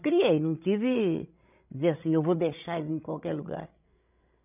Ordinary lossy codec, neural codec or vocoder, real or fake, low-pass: none; codec, 16 kHz, 16 kbps, FreqCodec, larger model; fake; 3.6 kHz